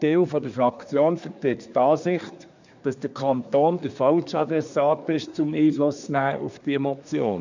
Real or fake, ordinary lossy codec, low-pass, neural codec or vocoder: fake; none; 7.2 kHz; codec, 24 kHz, 1 kbps, SNAC